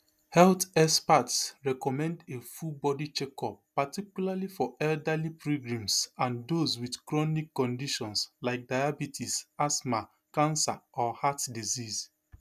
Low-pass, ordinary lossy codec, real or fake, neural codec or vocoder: 14.4 kHz; none; real; none